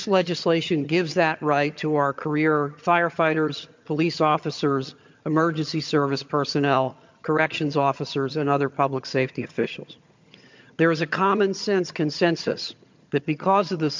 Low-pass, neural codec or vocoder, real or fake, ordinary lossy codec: 7.2 kHz; vocoder, 22.05 kHz, 80 mel bands, HiFi-GAN; fake; MP3, 64 kbps